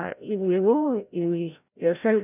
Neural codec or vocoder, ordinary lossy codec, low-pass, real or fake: codec, 16 kHz, 0.5 kbps, FreqCodec, larger model; none; 3.6 kHz; fake